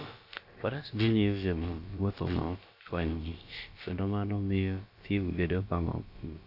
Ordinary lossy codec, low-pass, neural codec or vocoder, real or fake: none; 5.4 kHz; codec, 16 kHz, about 1 kbps, DyCAST, with the encoder's durations; fake